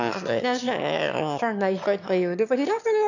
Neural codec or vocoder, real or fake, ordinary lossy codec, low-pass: autoencoder, 22.05 kHz, a latent of 192 numbers a frame, VITS, trained on one speaker; fake; none; 7.2 kHz